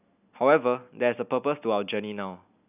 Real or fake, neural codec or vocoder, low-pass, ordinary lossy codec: real; none; 3.6 kHz; none